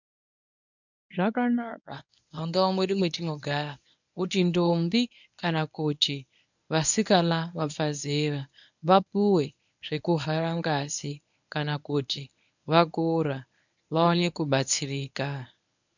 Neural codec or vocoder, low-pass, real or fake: codec, 24 kHz, 0.9 kbps, WavTokenizer, medium speech release version 1; 7.2 kHz; fake